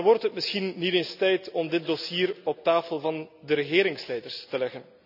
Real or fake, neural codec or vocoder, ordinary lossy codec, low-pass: real; none; none; 5.4 kHz